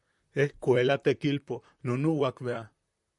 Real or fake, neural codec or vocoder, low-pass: fake; vocoder, 44.1 kHz, 128 mel bands, Pupu-Vocoder; 10.8 kHz